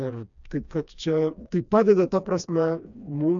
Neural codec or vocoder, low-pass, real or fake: codec, 16 kHz, 2 kbps, FreqCodec, smaller model; 7.2 kHz; fake